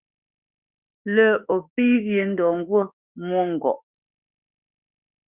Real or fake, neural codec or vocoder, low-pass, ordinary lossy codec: fake; autoencoder, 48 kHz, 32 numbers a frame, DAC-VAE, trained on Japanese speech; 3.6 kHz; Opus, 64 kbps